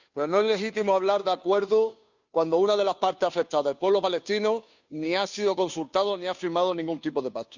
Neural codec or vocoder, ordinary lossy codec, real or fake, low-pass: codec, 16 kHz, 2 kbps, FunCodec, trained on Chinese and English, 25 frames a second; none; fake; 7.2 kHz